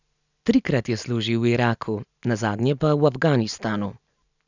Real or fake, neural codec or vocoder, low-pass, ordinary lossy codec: real; none; 7.2 kHz; none